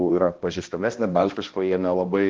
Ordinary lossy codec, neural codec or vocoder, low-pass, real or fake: Opus, 16 kbps; codec, 16 kHz, 1 kbps, X-Codec, HuBERT features, trained on balanced general audio; 7.2 kHz; fake